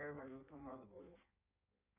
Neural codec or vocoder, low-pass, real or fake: codec, 16 kHz in and 24 kHz out, 1.1 kbps, FireRedTTS-2 codec; 3.6 kHz; fake